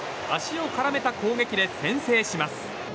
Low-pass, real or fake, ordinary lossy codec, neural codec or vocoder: none; real; none; none